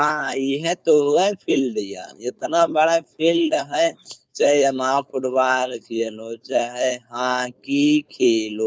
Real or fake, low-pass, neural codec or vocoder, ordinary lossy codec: fake; none; codec, 16 kHz, 4.8 kbps, FACodec; none